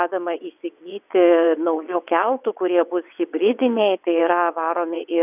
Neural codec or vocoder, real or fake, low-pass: vocoder, 22.05 kHz, 80 mel bands, WaveNeXt; fake; 3.6 kHz